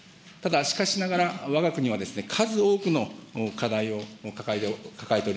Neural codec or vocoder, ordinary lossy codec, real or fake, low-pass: none; none; real; none